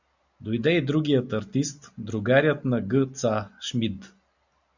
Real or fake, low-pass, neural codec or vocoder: real; 7.2 kHz; none